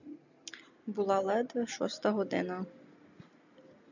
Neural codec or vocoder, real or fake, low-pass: none; real; 7.2 kHz